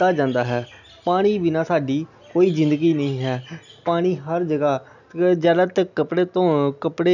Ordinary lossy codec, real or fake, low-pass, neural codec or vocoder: none; real; 7.2 kHz; none